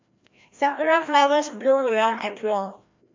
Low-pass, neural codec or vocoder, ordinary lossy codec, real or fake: 7.2 kHz; codec, 16 kHz, 1 kbps, FreqCodec, larger model; MP3, 48 kbps; fake